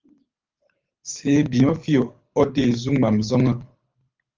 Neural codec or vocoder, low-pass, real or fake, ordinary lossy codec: codec, 24 kHz, 6 kbps, HILCodec; 7.2 kHz; fake; Opus, 24 kbps